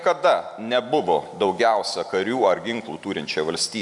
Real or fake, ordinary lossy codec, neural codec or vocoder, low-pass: real; MP3, 96 kbps; none; 10.8 kHz